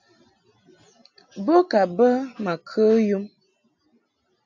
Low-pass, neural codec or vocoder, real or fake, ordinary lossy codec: 7.2 kHz; none; real; AAC, 48 kbps